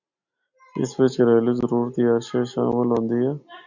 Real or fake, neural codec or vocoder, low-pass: real; none; 7.2 kHz